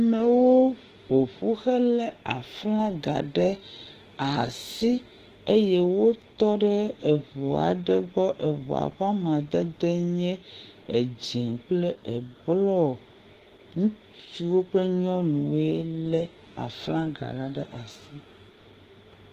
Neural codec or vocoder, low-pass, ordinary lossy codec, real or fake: autoencoder, 48 kHz, 32 numbers a frame, DAC-VAE, trained on Japanese speech; 14.4 kHz; Opus, 16 kbps; fake